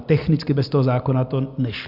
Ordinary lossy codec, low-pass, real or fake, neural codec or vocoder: Opus, 64 kbps; 5.4 kHz; real; none